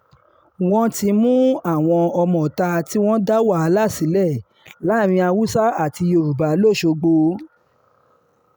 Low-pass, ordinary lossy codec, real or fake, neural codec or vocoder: none; none; real; none